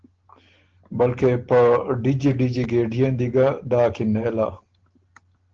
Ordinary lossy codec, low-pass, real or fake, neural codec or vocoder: Opus, 16 kbps; 7.2 kHz; real; none